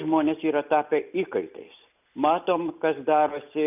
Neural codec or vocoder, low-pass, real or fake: none; 3.6 kHz; real